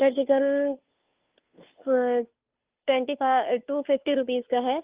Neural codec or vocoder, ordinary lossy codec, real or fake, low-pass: codec, 16 kHz, 2 kbps, FunCodec, trained on Chinese and English, 25 frames a second; Opus, 24 kbps; fake; 3.6 kHz